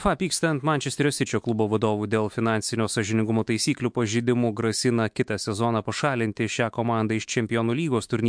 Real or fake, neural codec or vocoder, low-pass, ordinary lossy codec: fake; autoencoder, 48 kHz, 128 numbers a frame, DAC-VAE, trained on Japanese speech; 9.9 kHz; MP3, 64 kbps